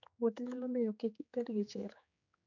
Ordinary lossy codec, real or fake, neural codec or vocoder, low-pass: none; fake; codec, 16 kHz, 4 kbps, X-Codec, HuBERT features, trained on general audio; 7.2 kHz